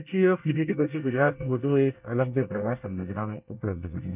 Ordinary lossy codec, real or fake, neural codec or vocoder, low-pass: none; fake; codec, 24 kHz, 1 kbps, SNAC; 3.6 kHz